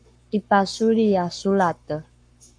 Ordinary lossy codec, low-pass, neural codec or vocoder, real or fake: AAC, 64 kbps; 9.9 kHz; codec, 44.1 kHz, 7.8 kbps, Pupu-Codec; fake